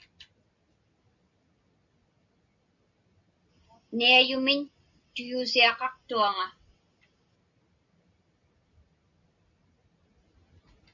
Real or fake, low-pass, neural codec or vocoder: real; 7.2 kHz; none